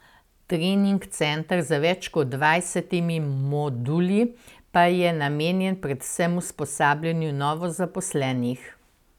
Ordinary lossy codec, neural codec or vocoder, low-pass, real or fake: none; none; 19.8 kHz; real